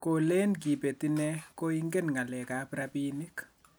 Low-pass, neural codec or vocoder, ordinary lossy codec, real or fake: none; none; none; real